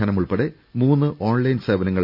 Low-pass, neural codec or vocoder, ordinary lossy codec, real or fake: 5.4 kHz; none; none; real